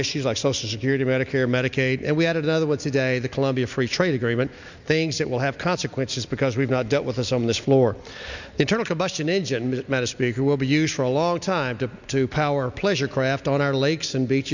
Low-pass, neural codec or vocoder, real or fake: 7.2 kHz; none; real